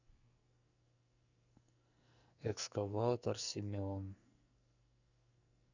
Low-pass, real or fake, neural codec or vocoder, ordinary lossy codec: 7.2 kHz; fake; codec, 44.1 kHz, 2.6 kbps, SNAC; none